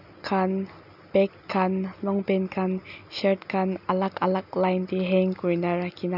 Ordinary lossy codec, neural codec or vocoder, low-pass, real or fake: none; none; 5.4 kHz; real